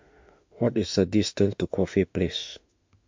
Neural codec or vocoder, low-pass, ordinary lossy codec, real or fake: autoencoder, 48 kHz, 32 numbers a frame, DAC-VAE, trained on Japanese speech; 7.2 kHz; MP3, 48 kbps; fake